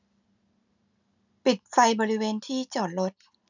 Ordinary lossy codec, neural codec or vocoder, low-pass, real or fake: none; none; 7.2 kHz; real